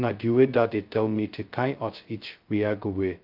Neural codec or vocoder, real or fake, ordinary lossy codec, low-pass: codec, 16 kHz, 0.2 kbps, FocalCodec; fake; Opus, 24 kbps; 5.4 kHz